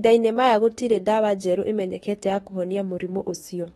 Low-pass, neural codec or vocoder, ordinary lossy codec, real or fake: 19.8 kHz; autoencoder, 48 kHz, 32 numbers a frame, DAC-VAE, trained on Japanese speech; AAC, 32 kbps; fake